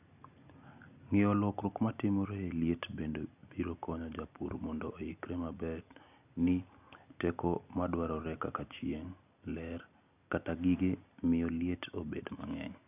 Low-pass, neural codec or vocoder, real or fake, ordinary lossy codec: 3.6 kHz; none; real; none